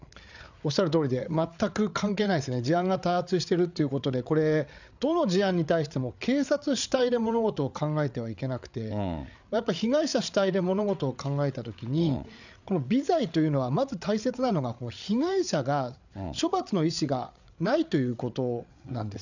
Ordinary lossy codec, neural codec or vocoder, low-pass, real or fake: none; codec, 16 kHz, 8 kbps, FreqCodec, larger model; 7.2 kHz; fake